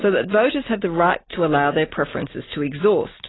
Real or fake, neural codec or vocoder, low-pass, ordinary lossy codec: real; none; 7.2 kHz; AAC, 16 kbps